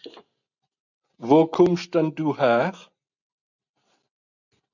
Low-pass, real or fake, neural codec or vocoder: 7.2 kHz; real; none